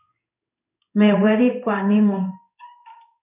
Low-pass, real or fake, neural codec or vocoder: 3.6 kHz; fake; codec, 16 kHz in and 24 kHz out, 1 kbps, XY-Tokenizer